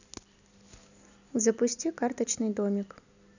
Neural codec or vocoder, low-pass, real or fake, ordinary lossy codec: none; 7.2 kHz; real; none